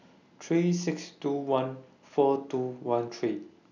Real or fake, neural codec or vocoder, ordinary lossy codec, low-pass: real; none; none; 7.2 kHz